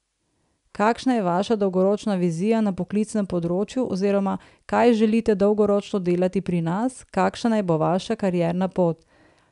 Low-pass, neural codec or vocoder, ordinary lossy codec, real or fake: 10.8 kHz; none; none; real